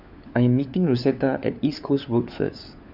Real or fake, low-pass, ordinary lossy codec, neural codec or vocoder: fake; 5.4 kHz; none; codec, 16 kHz, 4 kbps, FunCodec, trained on LibriTTS, 50 frames a second